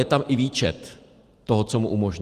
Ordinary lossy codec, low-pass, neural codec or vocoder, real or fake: Opus, 24 kbps; 14.4 kHz; vocoder, 44.1 kHz, 128 mel bands every 512 samples, BigVGAN v2; fake